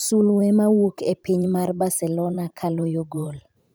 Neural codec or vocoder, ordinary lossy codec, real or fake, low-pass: vocoder, 44.1 kHz, 128 mel bands every 512 samples, BigVGAN v2; none; fake; none